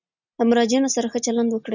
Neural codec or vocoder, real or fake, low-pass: none; real; 7.2 kHz